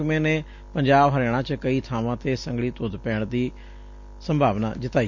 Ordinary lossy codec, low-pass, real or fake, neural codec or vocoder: MP3, 64 kbps; 7.2 kHz; real; none